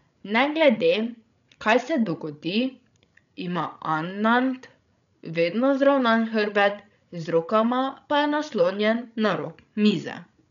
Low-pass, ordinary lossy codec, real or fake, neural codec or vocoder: 7.2 kHz; none; fake; codec, 16 kHz, 16 kbps, FreqCodec, larger model